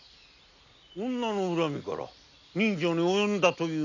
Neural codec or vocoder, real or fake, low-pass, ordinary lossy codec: none; real; 7.2 kHz; none